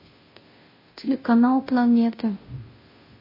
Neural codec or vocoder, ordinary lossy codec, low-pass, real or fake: codec, 16 kHz, 0.5 kbps, FunCodec, trained on Chinese and English, 25 frames a second; MP3, 24 kbps; 5.4 kHz; fake